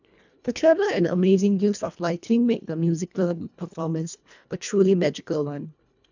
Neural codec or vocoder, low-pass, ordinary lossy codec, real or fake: codec, 24 kHz, 1.5 kbps, HILCodec; 7.2 kHz; none; fake